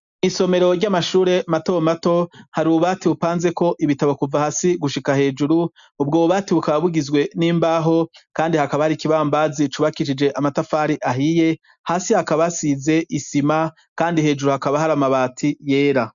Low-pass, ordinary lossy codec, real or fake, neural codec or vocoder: 7.2 kHz; MP3, 96 kbps; real; none